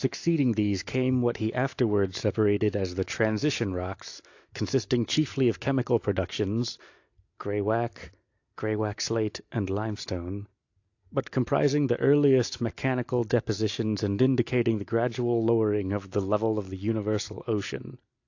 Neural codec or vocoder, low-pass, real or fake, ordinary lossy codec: none; 7.2 kHz; real; AAC, 48 kbps